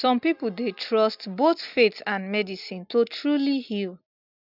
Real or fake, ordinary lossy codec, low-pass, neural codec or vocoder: real; none; 5.4 kHz; none